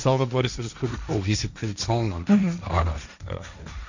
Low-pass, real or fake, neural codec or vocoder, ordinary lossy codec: 7.2 kHz; fake; codec, 16 kHz, 1.1 kbps, Voila-Tokenizer; none